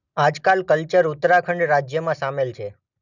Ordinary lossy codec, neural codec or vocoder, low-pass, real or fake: none; none; 7.2 kHz; real